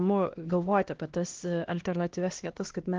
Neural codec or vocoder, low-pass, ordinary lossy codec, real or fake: codec, 16 kHz, 1 kbps, X-Codec, HuBERT features, trained on LibriSpeech; 7.2 kHz; Opus, 16 kbps; fake